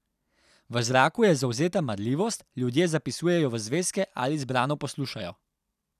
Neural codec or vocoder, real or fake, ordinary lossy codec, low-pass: none; real; none; 14.4 kHz